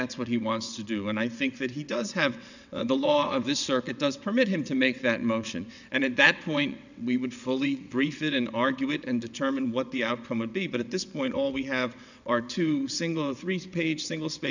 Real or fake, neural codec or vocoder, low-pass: fake; vocoder, 22.05 kHz, 80 mel bands, WaveNeXt; 7.2 kHz